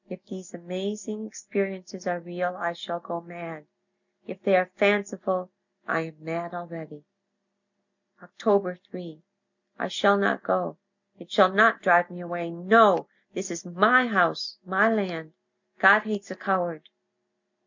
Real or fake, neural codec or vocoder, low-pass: real; none; 7.2 kHz